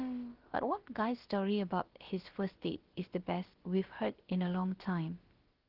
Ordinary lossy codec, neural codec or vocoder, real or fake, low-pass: Opus, 16 kbps; codec, 16 kHz, about 1 kbps, DyCAST, with the encoder's durations; fake; 5.4 kHz